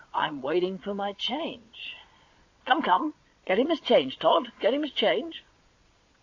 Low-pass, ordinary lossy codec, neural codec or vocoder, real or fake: 7.2 kHz; AAC, 48 kbps; vocoder, 22.05 kHz, 80 mel bands, Vocos; fake